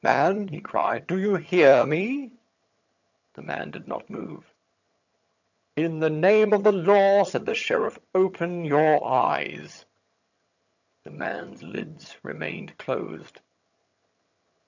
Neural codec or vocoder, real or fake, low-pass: vocoder, 22.05 kHz, 80 mel bands, HiFi-GAN; fake; 7.2 kHz